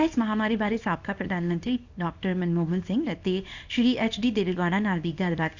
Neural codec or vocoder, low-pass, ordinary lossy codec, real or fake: codec, 24 kHz, 0.9 kbps, WavTokenizer, small release; 7.2 kHz; none; fake